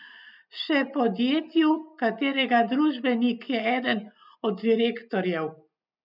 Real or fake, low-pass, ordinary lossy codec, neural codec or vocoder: real; 5.4 kHz; none; none